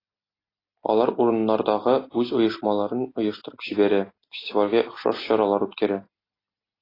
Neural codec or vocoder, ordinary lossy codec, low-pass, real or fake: none; AAC, 24 kbps; 5.4 kHz; real